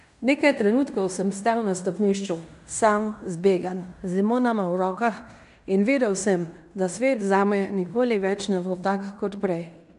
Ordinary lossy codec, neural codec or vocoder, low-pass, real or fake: none; codec, 16 kHz in and 24 kHz out, 0.9 kbps, LongCat-Audio-Codec, fine tuned four codebook decoder; 10.8 kHz; fake